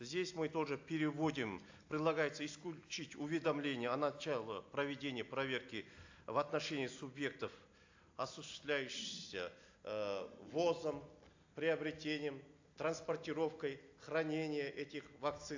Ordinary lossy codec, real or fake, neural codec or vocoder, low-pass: AAC, 48 kbps; real; none; 7.2 kHz